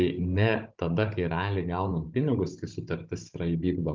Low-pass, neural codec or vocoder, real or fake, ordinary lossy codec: 7.2 kHz; codec, 16 kHz, 16 kbps, FunCodec, trained on Chinese and English, 50 frames a second; fake; Opus, 24 kbps